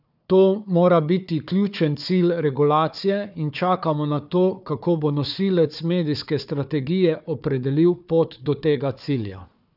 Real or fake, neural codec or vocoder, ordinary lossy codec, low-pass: fake; codec, 16 kHz, 4 kbps, FunCodec, trained on Chinese and English, 50 frames a second; none; 5.4 kHz